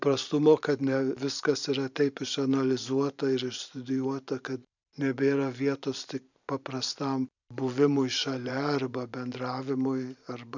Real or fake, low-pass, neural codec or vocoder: fake; 7.2 kHz; vocoder, 44.1 kHz, 128 mel bands every 512 samples, BigVGAN v2